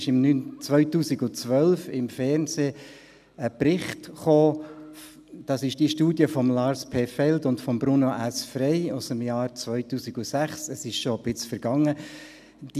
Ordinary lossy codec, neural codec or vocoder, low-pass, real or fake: none; none; 14.4 kHz; real